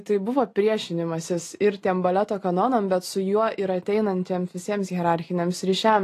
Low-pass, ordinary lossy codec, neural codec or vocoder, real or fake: 14.4 kHz; AAC, 48 kbps; none; real